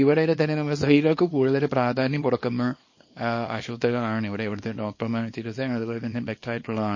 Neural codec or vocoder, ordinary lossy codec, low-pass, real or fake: codec, 24 kHz, 0.9 kbps, WavTokenizer, small release; MP3, 32 kbps; 7.2 kHz; fake